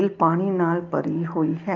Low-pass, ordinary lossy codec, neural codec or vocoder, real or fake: 7.2 kHz; Opus, 32 kbps; none; real